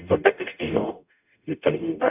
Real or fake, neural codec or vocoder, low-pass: fake; codec, 44.1 kHz, 0.9 kbps, DAC; 3.6 kHz